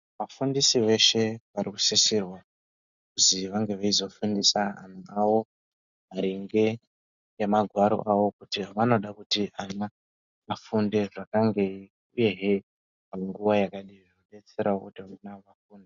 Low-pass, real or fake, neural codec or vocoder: 7.2 kHz; real; none